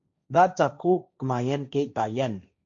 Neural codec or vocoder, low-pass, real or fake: codec, 16 kHz, 1.1 kbps, Voila-Tokenizer; 7.2 kHz; fake